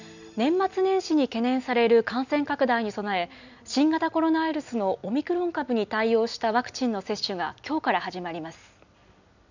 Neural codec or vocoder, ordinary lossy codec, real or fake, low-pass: none; none; real; 7.2 kHz